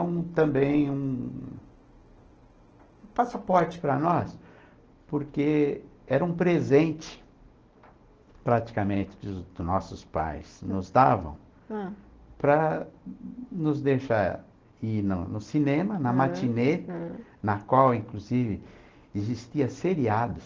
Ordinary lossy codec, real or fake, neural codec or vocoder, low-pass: Opus, 16 kbps; real; none; 7.2 kHz